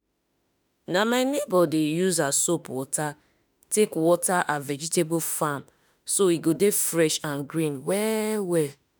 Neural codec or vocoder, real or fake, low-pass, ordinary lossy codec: autoencoder, 48 kHz, 32 numbers a frame, DAC-VAE, trained on Japanese speech; fake; none; none